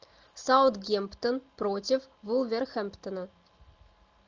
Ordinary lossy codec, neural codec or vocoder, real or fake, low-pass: Opus, 24 kbps; none; real; 7.2 kHz